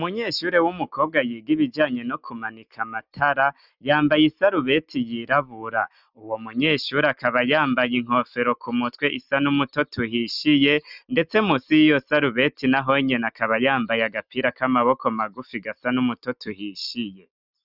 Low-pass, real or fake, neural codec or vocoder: 5.4 kHz; real; none